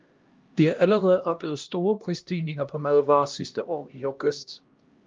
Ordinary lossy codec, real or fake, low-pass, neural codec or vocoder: Opus, 32 kbps; fake; 7.2 kHz; codec, 16 kHz, 1 kbps, X-Codec, HuBERT features, trained on LibriSpeech